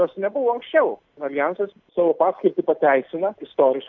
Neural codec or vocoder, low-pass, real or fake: none; 7.2 kHz; real